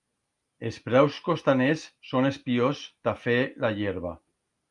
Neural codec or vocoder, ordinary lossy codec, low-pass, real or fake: none; Opus, 32 kbps; 10.8 kHz; real